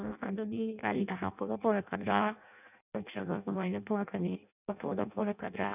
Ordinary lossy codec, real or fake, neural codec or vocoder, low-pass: none; fake; codec, 16 kHz in and 24 kHz out, 0.6 kbps, FireRedTTS-2 codec; 3.6 kHz